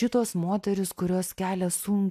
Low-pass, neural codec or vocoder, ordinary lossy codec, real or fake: 14.4 kHz; none; AAC, 96 kbps; real